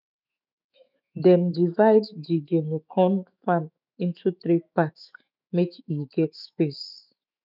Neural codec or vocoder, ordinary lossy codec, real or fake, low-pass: autoencoder, 48 kHz, 128 numbers a frame, DAC-VAE, trained on Japanese speech; none; fake; 5.4 kHz